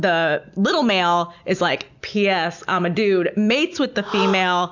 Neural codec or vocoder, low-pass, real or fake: none; 7.2 kHz; real